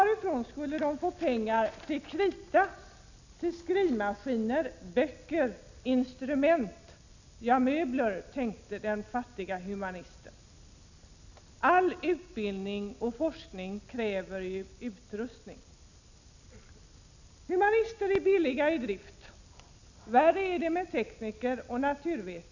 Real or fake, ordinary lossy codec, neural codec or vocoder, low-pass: real; none; none; 7.2 kHz